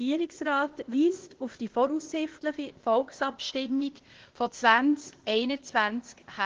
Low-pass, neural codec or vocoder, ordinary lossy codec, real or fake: 7.2 kHz; codec, 16 kHz, 0.8 kbps, ZipCodec; Opus, 16 kbps; fake